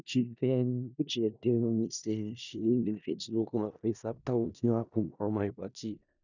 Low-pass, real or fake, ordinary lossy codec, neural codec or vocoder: 7.2 kHz; fake; none; codec, 16 kHz in and 24 kHz out, 0.4 kbps, LongCat-Audio-Codec, four codebook decoder